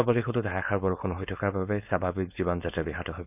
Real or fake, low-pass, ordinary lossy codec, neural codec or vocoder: fake; 3.6 kHz; none; codec, 16 kHz, 4.8 kbps, FACodec